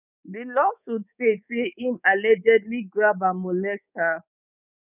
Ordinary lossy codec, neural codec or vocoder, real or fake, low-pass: none; codec, 24 kHz, 3.1 kbps, DualCodec; fake; 3.6 kHz